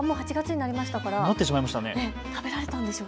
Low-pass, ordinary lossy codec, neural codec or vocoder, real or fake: none; none; none; real